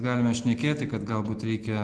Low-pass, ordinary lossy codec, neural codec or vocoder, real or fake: 10.8 kHz; Opus, 16 kbps; none; real